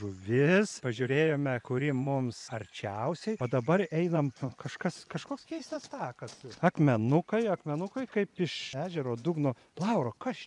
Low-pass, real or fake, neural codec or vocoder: 10.8 kHz; fake; vocoder, 24 kHz, 100 mel bands, Vocos